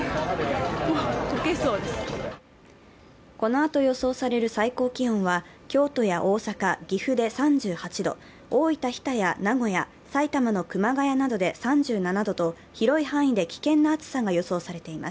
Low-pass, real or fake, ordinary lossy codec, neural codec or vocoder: none; real; none; none